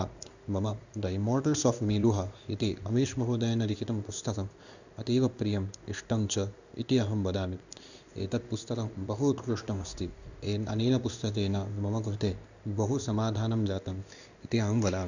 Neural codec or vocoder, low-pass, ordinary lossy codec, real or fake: codec, 16 kHz in and 24 kHz out, 1 kbps, XY-Tokenizer; 7.2 kHz; none; fake